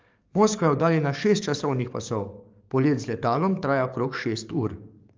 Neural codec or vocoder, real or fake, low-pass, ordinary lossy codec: codec, 44.1 kHz, 7.8 kbps, DAC; fake; 7.2 kHz; Opus, 32 kbps